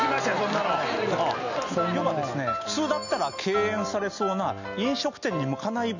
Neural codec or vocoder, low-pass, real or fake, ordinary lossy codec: none; 7.2 kHz; real; none